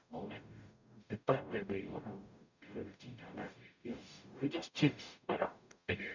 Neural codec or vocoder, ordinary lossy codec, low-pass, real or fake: codec, 44.1 kHz, 0.9 kbps, DAC; none; 7.2 kHz; fake